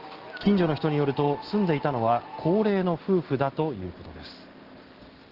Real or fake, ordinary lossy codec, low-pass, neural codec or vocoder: real; Opus, 16 kbps; 5.4 kHz; none